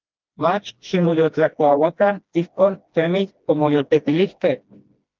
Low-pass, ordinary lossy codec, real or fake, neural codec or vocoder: 7.2 kHz; Opus, 32 kbps; fake; codec, 16 kHz, 1 kbps, FreqCodec, smaller model